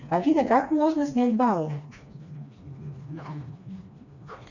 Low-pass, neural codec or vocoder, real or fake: 7.2 kHz; codec, 16 kHz, 2 kbps, FreqCodec, smaller model; fake